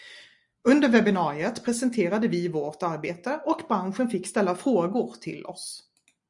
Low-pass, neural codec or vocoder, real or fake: 10.8 kHz; none; real